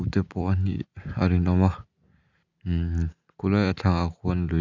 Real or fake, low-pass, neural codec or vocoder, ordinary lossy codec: real; 7.2 kHz; none; none